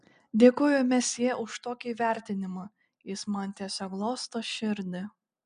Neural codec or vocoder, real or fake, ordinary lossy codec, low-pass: none; real; MP3, 96 kbps; 9.9 kHz